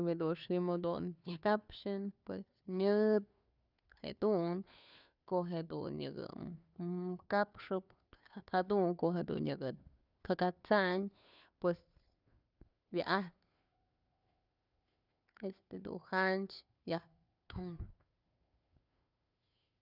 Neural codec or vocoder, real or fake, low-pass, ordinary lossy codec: codec, 16 kHz, 2 kbps, FunCodec, trained on Chinese and English, 25 frames a second; fake; 5.4 kHz; none